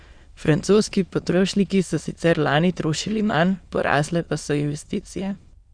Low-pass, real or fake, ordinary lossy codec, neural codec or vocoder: 9.9 kHz; fake; Opus, 64 kbps; autoencoder, 22.05 kHz, a latent of 192 numbers a frame, VITS, trained on many speakers